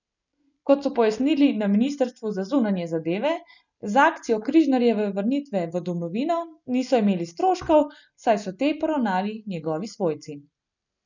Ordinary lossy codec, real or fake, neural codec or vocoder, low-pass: none; real; none; 7.2 kHz